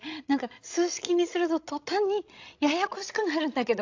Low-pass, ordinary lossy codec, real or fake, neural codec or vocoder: 7.2 kHz; AAC, 48 kbps; fake; codec, 16 kHz, 16 kbps, FreqCodec, larger model